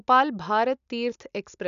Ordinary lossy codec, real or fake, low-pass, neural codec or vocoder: none; real; 7.2 kHz; none